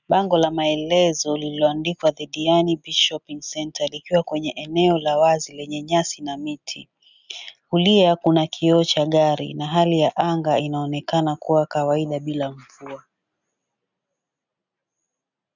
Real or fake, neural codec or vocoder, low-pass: real; none; 7.2 kHz